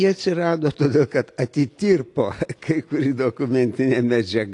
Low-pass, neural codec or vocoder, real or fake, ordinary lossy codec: 10.8 kHz; none; real; AAC, 48 kbps